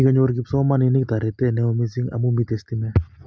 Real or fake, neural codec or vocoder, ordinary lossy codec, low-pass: real; none; none; none